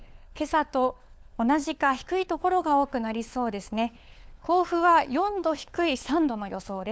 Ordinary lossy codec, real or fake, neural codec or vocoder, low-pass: none; fake; codec, 16 kHz, 4 kbps, FunCodec, trained on LibriTTS, 50 frames a second; none